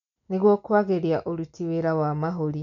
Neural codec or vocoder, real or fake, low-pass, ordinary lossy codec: none; real; 7.2 kHz; MP3, 96 kbps